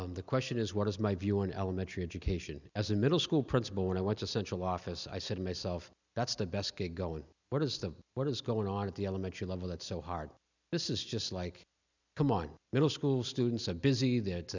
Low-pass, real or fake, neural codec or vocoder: 7.2 kHz; real; none